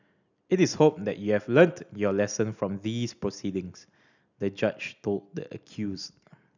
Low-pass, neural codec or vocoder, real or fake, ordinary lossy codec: 7.2 kHz; none; real; none